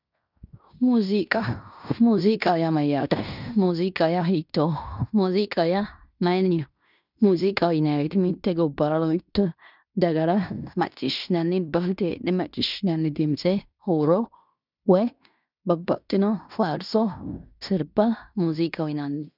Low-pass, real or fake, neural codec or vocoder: 5.4 kHz; fake; codec, 16 kHz in and 24 kHz out, 0.9 kbps, LongCat-Audio-Codec, fine tuned four codebook decoder